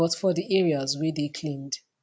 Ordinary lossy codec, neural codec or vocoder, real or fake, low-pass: none; none; real; none